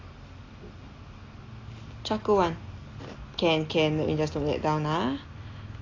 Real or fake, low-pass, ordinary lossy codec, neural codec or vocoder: real; 7.2 kHz; AAC, 32 kbps; none